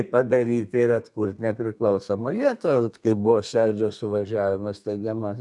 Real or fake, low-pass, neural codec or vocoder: fake; 10.8 kHz; codec, 32 kHz, 1.9 kbps, SNAC